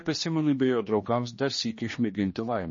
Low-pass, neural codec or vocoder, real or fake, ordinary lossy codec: 7.2 kHz; codec, 16 kHz, 2 kbps, X-Codec, HuBERT features, trained on general audio; fake; MP3, 32 kbps